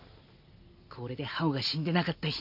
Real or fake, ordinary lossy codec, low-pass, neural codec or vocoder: real; none; 5.4 kHz; none